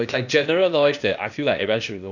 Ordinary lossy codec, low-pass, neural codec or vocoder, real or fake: none; 7.2 kHz; codec, 16 kHz, 0.8 kbps, ZipCodec; fake